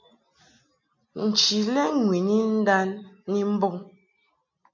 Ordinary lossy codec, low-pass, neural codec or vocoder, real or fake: MP3, 64 kbps; 7.2 kHz; none; real